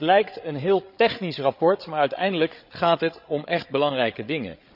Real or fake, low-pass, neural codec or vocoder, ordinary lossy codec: fake; 5.4 kHz; codec, 16 kHz, 8 kbps, FreqCodec, larger model; none